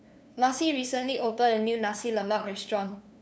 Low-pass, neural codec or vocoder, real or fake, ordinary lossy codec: none; codec, 16 kHz, 2 kbps, FunCodec, trained on LibriTTS, 25 frames a second; fake; none